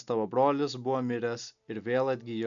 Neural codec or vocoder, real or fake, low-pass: none; real; 7.2 kHz